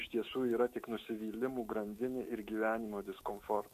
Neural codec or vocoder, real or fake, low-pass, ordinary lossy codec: autoencoder, 48 kHz, 128 numbers a frame, DAC-VAE, trained on Japanese speech; fake; 14.4 kHz; MP3, 64 kbps